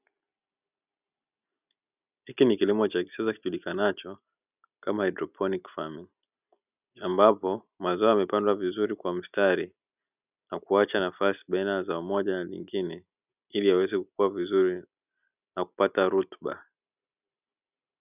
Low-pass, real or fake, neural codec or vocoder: 3.6 kHz; real; none